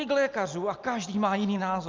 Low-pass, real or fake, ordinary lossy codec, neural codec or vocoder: 7.2 kHz; real; Opus, 24 kbps; none